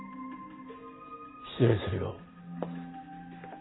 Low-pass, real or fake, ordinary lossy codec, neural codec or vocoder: 7.2 kHz; real; AAC, 16 kbps; none